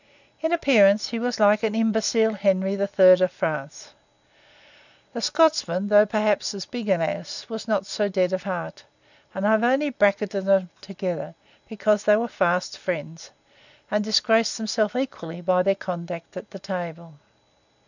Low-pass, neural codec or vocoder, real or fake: 7.2 kHz; none; real